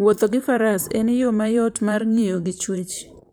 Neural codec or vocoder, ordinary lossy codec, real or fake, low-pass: vocoder, 44.1 kHz, 128 mel bands, Pupu-Vocoder; none; fake; none